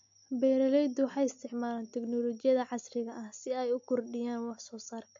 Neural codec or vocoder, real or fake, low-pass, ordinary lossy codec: none; real; 7.2 kHz; MP3, 48 kbps